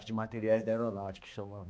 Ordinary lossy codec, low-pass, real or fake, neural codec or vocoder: none; none; fake; codec, 16 kHz, 2 kbps, X-Codec, HuBERT features, trained on balanced general audio